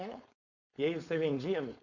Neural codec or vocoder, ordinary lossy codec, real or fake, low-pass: codec, 16 kHz, 4.8 kbps, FACodec; none; fake; 7.2 kHz